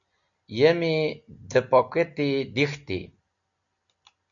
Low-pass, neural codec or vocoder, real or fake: 7.2 kHz; none; real